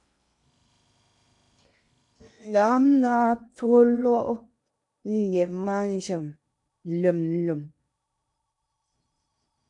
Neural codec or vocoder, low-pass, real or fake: codec, 16 kHz in and 24 kHz out, 0.8 kbps, FocalCodec, streaming, 65536 codes; 10.8 kHz; fake